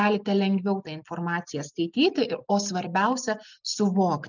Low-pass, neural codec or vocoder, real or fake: 7.2 kHz; none; real